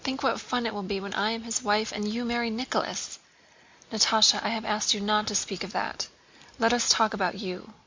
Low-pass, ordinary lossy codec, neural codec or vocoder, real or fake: 7.2 kHz; MP3, 64 kbps; none; real